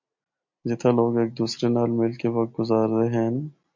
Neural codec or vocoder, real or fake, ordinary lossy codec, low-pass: none; real; MP3, 48 kbps; 7.2 kHz